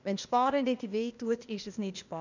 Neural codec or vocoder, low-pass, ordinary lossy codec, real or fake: codec, 16 kHz, 0.8 kbps, ZipCodec; 7.2 kHz; none; fake